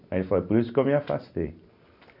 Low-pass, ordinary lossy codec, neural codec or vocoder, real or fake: 5.4 kHz; none; none; real